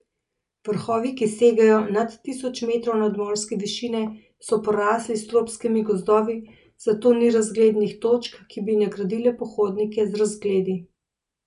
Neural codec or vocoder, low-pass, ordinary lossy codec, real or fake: none; 10.8 kHz; none; real